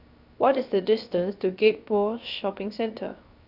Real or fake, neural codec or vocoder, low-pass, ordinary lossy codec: fake; codec, 16 kHz, 0.7 kbps, FocalCodec; 5.4 kHz; none